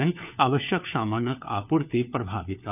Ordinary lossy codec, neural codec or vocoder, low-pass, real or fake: none; codec, 16 kHz, 4 kbps, FunCodec, trained on LibriTTS, 50 frames a second; 3.6 kHz; fake